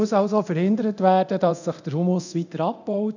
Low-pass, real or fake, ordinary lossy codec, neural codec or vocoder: 7.2 kHz; fake; none; codec, 24 kHz, 0.9 kbps, DualCodec